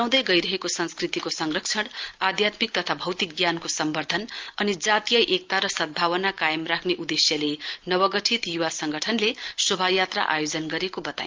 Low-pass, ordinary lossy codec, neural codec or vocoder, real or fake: 7.2 kHz; Opus, 24 kbps; none; real